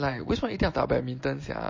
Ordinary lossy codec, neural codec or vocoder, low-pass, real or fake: MP3, 32 kbps; none; 7.2 kHz; real